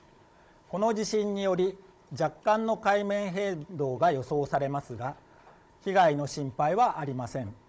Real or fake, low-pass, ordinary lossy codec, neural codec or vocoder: fake; none; none; codec, 16 kHz, 16 kbps, FunCodec, trained on Chinese and English, 50 frames a second